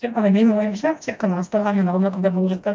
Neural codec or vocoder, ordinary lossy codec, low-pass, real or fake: codec, 16 kHz, 1 kbps, FreqCodec, smaller model; none; none; fake